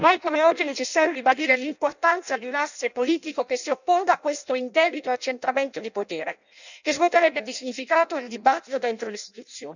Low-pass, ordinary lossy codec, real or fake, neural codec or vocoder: 7.2 kHz; none; fake; codec, 16 kHz in and 24 kHz out, 0.6 kbps, FireRedTTS-2 codec